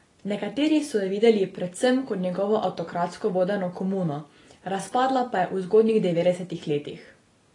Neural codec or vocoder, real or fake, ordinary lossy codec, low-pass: none; real; AAC, 32 kbps; 10.8 kHz